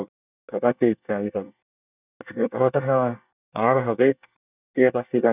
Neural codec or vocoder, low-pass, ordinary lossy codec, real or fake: codec, 24 kHz, 1 kbps, SNAC; 3.6 kHz; none; fake